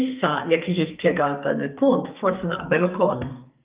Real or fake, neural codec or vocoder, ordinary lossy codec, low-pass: fake; codec, 44.1 kHz, 2.6 kbps, SNAC; Opus, 24 kbps; 3.6 kHz